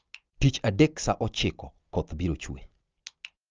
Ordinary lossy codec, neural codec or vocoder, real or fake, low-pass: Opus, 24 kbps; none; real; 7.2 kHz